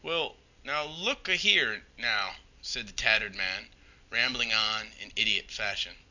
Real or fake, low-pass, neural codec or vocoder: real; 7.2 kHz; none